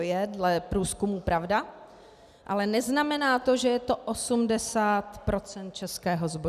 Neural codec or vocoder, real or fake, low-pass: none; real; 14.4 kHz